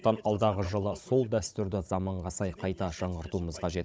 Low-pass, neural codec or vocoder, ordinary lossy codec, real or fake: none; codec, 16 kHz, 16 kbps, FunCodec, trained on Chinese and English, 50 frames a second; none; fake